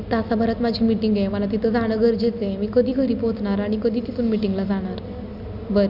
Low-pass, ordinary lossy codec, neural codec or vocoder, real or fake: 5.4 kHz; none; none; real